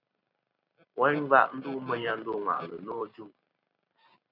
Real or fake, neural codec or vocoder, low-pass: fake; vocoder, 44.1 kHz, 128 mel bands every 512 samples, BigVGAN v2; 5.4 kHz